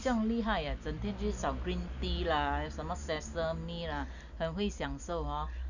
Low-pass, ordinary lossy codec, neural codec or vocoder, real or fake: 7.2 kHz; none; none; real